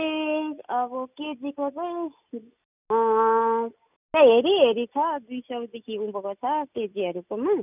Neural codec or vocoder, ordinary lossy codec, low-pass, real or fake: none; none; 3.6 kHz; real